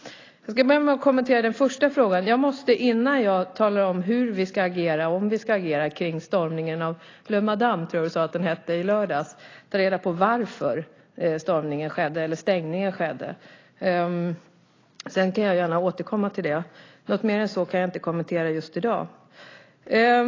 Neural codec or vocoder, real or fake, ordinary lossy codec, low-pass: none; real; AAC, 32 kbps; 7.2 kHz